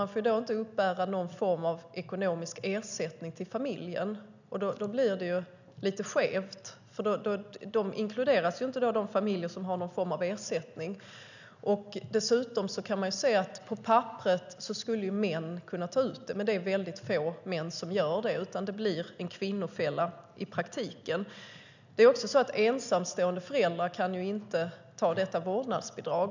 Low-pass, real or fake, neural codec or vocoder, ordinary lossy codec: 7.2 kHz; real; none; none